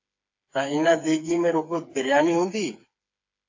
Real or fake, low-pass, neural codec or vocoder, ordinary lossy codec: fake; 7.2 kHz; codec, 16 kHz, 4 kbps, FreqCodec, smaller model; AAC, 48 kbps